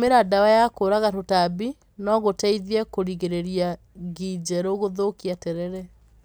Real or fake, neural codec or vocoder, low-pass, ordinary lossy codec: real; none; none; none